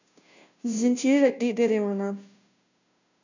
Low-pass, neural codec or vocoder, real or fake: 7.2 kHz; codec, 16 kHz, 0.5 kbps, FunCodec, trained on Chinese and English, 25 frames a second; fake